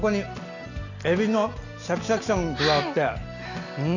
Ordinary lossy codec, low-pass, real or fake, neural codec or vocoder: none; 7.2 kHz; real; none